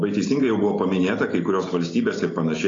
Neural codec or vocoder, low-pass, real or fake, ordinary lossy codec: none; 7.2 kHz; real; AAC, 32 kbps